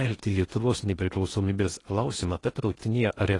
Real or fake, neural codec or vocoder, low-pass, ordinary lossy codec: fake; codec, 16 kHz in and 24 kHz out, 0.8 kbps, FocalCodec, streaming, 65536 codes; 10.8 kHz; AAC, 32 kbps